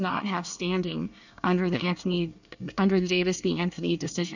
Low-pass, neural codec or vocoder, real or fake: 7.2 kHz; codec, 24 kHz, 1 kbps, SNAC; fake